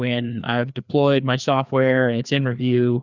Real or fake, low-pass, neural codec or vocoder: fake; 7.2 kHz; codec, 16 kHz, 2 kbps, FreqCodec, larger model